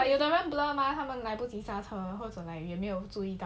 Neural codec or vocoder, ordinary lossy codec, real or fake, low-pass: none; none; real; none